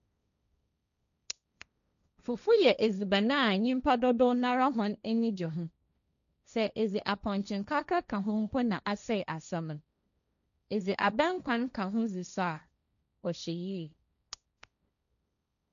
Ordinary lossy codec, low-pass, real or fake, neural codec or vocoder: none; 7.2 kHz; fake; codec, 16 kHz, 1.1 kbps, Voila-Tokenizer